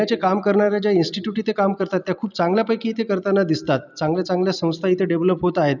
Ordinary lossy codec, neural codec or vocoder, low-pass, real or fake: none; none; 7.2 kHz; real